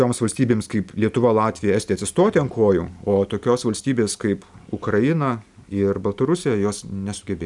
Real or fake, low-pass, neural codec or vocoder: real; 10.8 kHz; none